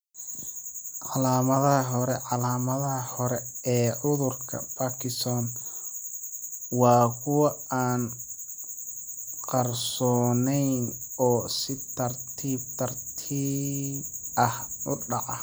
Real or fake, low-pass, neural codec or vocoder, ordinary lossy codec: real; none; none; none